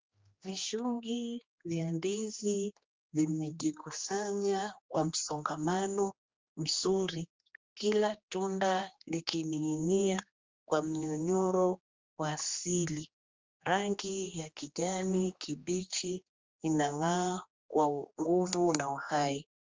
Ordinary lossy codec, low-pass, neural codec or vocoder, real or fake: Opus, 32 kbps; 7.2 kHz; codec, 16 kHz, 2 kbps, X-Codec, HuBERT features, trained on general audio; fake